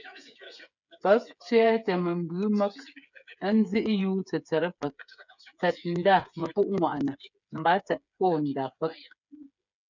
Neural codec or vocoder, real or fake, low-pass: codec, 16 kHz, 8 kbps, FreqCodec, smaller model; fake; 7.2 kHz